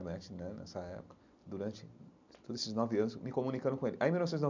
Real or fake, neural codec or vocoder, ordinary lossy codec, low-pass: real; none; MP3, 64 kbps; 7.2 kHz